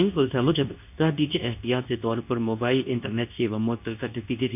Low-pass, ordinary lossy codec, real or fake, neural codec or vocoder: 3.6 kHz; none; fake; codec, 24 kHz, 0.9 kbps, WavTokenizer, medium speech release version 2